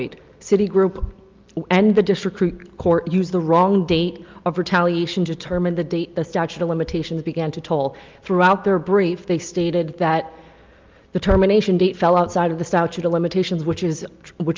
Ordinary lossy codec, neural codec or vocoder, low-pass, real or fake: Opus, 32 kbps; none; 7.2 kHz; real